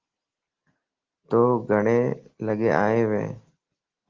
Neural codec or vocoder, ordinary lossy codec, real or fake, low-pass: none; Opus, 16 kbps; real; 7.2 kHz